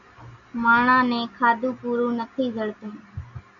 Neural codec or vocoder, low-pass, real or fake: none; 7.2 kHz; real